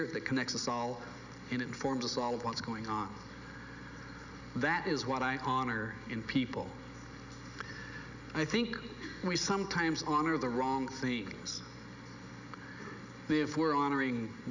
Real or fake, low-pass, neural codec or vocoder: real; 7.2 kHz; none